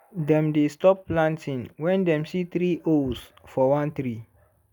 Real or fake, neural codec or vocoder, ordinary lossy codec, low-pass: real; none; none; 19.8 kHz